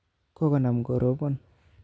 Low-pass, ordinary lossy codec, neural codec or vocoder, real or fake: none; none; none; real